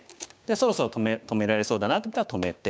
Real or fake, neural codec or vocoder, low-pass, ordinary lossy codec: fake; codec, 16 kHz, 6 kbps, DAC; none; none